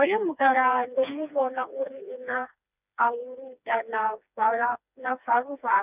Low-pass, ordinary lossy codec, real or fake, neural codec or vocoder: 3.6 kHz; none; fake; codec, 16 kHz, 2 kbps, FreqCodec, smaller model